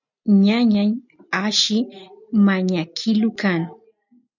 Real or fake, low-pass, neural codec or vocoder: real; 7.2 kHz; none